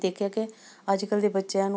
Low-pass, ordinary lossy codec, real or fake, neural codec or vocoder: none; none; real; none